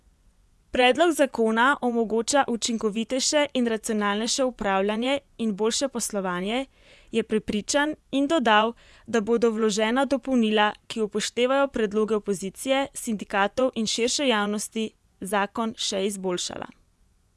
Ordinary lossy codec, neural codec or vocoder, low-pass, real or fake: none; vocoder, 24 kHz, 100 mel bands, Vocos; none; fake